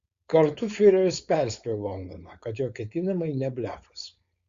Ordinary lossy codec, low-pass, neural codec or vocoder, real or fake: Opus, 64 kbps; 7.2 kHz; codec, 16 kHz, 4.8 kbps, FACodec; fake